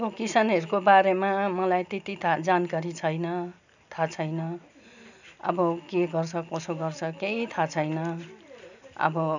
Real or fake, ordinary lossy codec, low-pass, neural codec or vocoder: real; none; 7.2 kHz; none